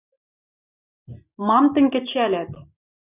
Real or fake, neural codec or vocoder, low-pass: real; none; 3.6 kHz